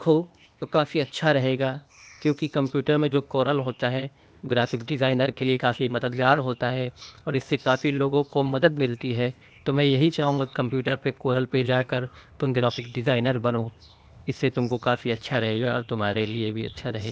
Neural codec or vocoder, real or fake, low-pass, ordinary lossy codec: codec, 16 kHz, 0.8 kbps, ZipCodec; fake; none; none